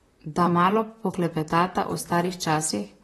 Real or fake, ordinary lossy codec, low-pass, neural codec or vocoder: fake; AAC, 32 kbps; 19.8 kHz; vocoder, 44.1 kHz, 128 mel bands, Pupu-Vocoder